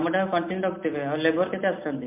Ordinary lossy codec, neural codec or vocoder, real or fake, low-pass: MP3, 32 kbps; none; real; 3.6 kHz